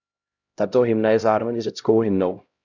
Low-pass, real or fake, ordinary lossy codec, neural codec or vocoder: 7.2 kHz; fake; Opus, 64 kbps; codec, 16 kHz, 0.5 kbps, X-Codec, HuBERT features, trained on LibriSpeech